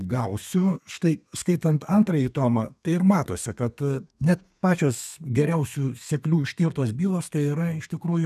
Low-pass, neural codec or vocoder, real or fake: 14.4 kHz; codec, 32 kHz, 1.9 kbps, SNAC; fake